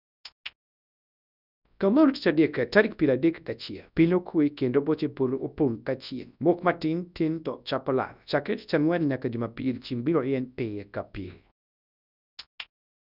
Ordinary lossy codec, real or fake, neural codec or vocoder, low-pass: none; fake; codec, 24 kHz, 0.9 kbps, WavTokenizer, large speech release; 5.4 kHz